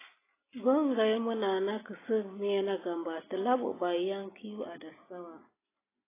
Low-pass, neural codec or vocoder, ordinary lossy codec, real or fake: 3.6 kHz; none; AAC, 16 kbps; real